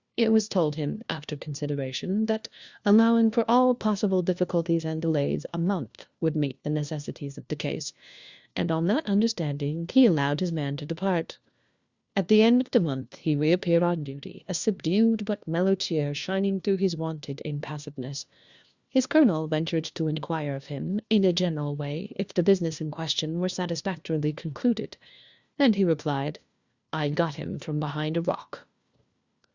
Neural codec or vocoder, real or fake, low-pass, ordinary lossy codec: codec, 16 kHz, 1 kbps, FunCodec, trained on LibriTTS, 50 frames a second; fake; 7.2 kHz; Opus, 64 kbps